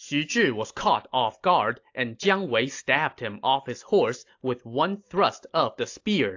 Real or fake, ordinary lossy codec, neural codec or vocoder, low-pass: real; AAC, 48 kbps; none; 7.2 kHz